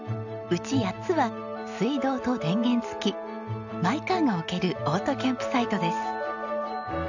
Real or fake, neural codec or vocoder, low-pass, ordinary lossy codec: real; none; 7.2 kHz; none